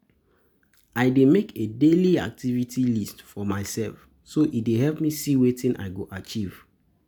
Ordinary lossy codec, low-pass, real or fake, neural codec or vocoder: none; none; real; none